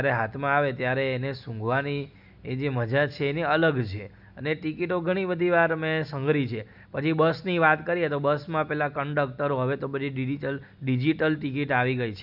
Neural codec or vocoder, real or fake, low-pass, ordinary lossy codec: none; real; 5.4 kHz; none